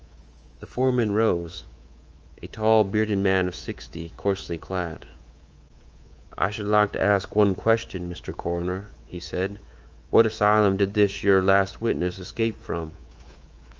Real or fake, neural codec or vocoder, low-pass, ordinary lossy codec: fake; codec, 24 kHz, 3.1 kbps, DualCodec; 7.2 kHz; Opus, 24 kbps